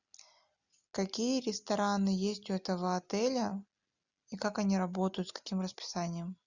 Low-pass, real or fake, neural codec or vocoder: 7.2 kHz; real; none